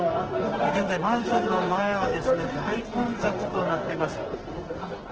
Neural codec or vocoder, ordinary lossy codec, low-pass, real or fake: codec, 44.1 kHz, 2.6 kbps, SNAC; Opus, 16 kbps; 7.2 kHz; fake